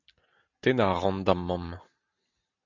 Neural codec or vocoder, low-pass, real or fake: none; 7.2 kHz; real